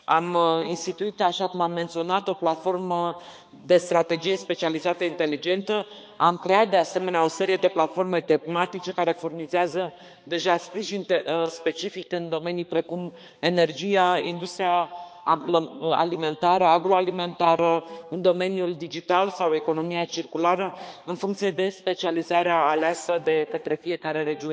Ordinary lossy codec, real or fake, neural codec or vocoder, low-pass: none; fake; codec, 16 kHz, 2 kbps, X-Codec, HuBERT features, trained on balanced general audio; none